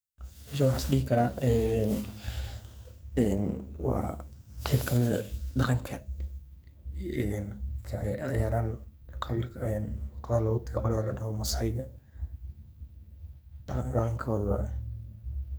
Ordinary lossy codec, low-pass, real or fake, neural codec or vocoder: none; none; fake; codec, 44.1 kHz, 2.6 kbps, SNAC